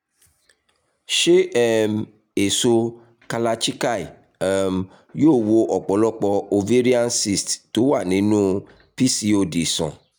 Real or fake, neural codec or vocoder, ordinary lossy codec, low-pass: real; none; none; none